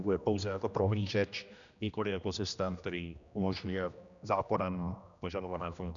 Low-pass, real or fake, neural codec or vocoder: 7.2 kHz; fake; codec, 16 kHz, 1 kbps, X-Codec, HuBERT features, trained on general audio